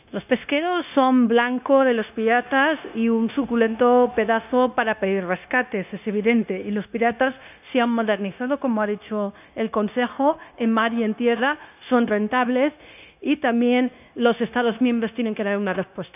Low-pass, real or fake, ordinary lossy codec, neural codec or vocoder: 3.6 kHz; fake; none; codec, 16 kHz, 0.9 kbps, LongCat-Audio-Codec